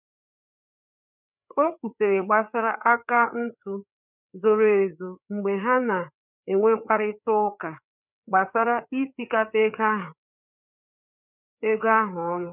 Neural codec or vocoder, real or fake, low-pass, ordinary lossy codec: codec, 16 kHz, 4 kbps, FreqCodec, larger model; fake; 3.6 kHz; none